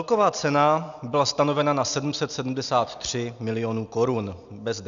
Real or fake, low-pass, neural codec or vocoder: real; 7.2 kHz; none